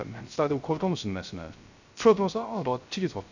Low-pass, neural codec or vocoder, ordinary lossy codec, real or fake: 7.2 kHz; codec, 16 kHz, 0.3 kbps, FocalCodec; none; fake